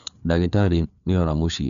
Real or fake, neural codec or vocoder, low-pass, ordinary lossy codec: fake; codec, 16 kHz, 4 kbps, FreqCodec, larger model; 7.2 kHz; none